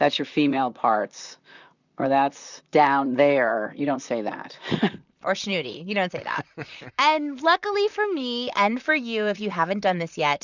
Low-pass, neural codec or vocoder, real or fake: 7.2 kHz; vocoder, 44.1 kHz, 128 mel bands, Pupu-Vocoder; fake